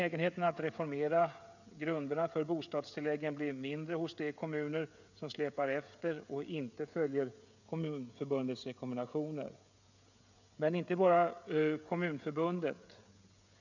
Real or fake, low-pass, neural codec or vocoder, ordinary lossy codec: fake; 7.2 kHz; codec, 16 kHz, 16 kbps, FreqCodec, smaller model; none